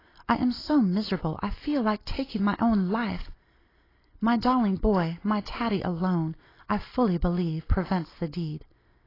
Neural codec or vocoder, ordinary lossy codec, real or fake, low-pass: none; AAC, 24 kbps; real; 5.4 kHz